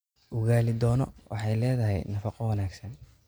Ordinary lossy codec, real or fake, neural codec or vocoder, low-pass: none; real; none; none